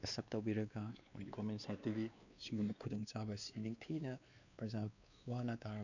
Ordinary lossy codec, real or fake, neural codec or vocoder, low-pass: none; fake; codec, 16 kHz, 2 kbps, X-Codec, WavLM features, trained on Multilingual LibriSpeech; 7.2 kHz